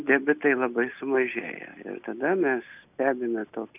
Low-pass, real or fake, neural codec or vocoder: 3.6 kHz; real; none